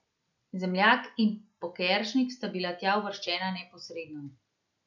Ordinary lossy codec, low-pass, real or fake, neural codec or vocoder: none; 7.2 kHz; real; none